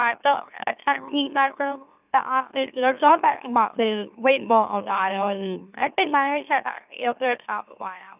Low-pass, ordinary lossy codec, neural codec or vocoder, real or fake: 3.6 kHz; none; autoencoder, 44.1 kHz, a latent of 192 numbers a frame, MeloTTS; fake